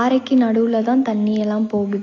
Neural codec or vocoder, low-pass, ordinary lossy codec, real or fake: none; 7.2 kHz; AAC, 32 kbps; real